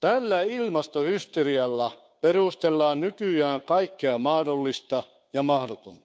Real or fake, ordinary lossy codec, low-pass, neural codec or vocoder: fake; none; none; codec, 16 kHz, 2 kbps, FunCodec, trained on Chinese and English, 25 frames a second